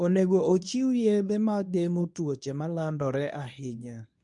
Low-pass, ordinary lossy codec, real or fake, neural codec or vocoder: 10.8 kHz; none; fake; codec, 24 kHz, 0.9 kbps, WavTokenizer, medium speech release version 1